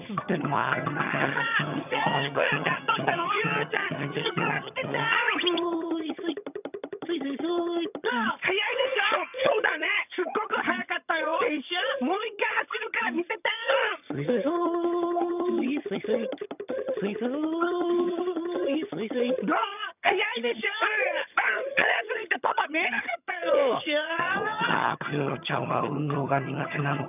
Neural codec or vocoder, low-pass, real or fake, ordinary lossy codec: vocoder, 22.05 kHz, 80 mel bands, HiFi-GAN; 3.6 kHz; fake; none